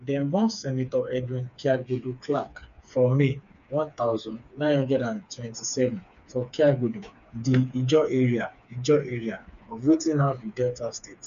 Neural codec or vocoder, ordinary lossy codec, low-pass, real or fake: codec, 16 kHz, 4 kbps, FreqCodec, smaller model; none; 7.2 kHz; fake